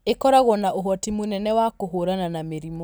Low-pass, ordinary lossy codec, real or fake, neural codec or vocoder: none; none; real; none